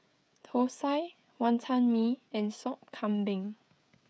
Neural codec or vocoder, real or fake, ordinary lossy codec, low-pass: codec, 16 kHz, 16 kbps, FreqCodec, smaller model; fake; none; none